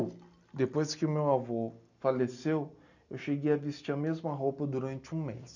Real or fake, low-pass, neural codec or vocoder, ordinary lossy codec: real; 7.2 kHz; none; none